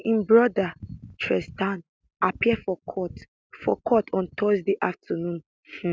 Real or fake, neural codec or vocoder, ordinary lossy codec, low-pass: real; none; none; none